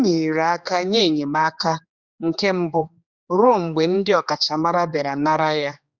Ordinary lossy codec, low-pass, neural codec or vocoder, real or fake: Opus, 64 kbps; 7.2 kHz; codec, 16 kHz, 2 kbps, X-Codec, HuBERT features, trained on general audio; fake